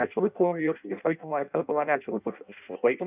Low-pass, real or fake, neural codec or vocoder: 3.6 kHz; fake; codec, 16 kHz in and 24 kHz out, 0.6 kbps, FireRedTTS-2 codec